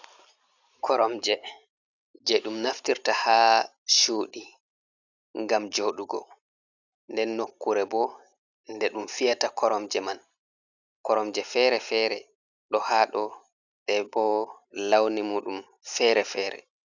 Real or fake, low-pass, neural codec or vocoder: real; 7.2 kHz; none